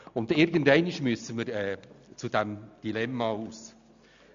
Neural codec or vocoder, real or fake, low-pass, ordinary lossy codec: none; real; 7.2 kHz; MP3, 64 kbps